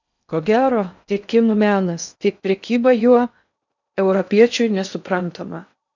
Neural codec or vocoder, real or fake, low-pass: codec, 16 kHz in and 24 kHz out, 0.6 kbps, FocalCodec, streaming, 2048 codes; fake; 7.2 kHz